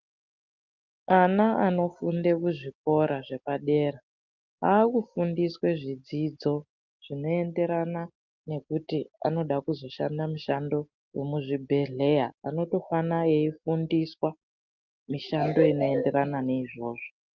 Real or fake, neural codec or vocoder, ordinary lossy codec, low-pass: real; none; Opus, 24 kbps; 7.2 kHz